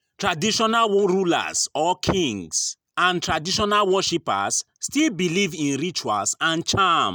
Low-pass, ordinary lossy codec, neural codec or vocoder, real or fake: none; none; none; real